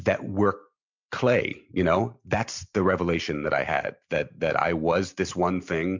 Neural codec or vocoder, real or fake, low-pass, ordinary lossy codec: none; real; 7.2 kHz; MP3, 64 kbps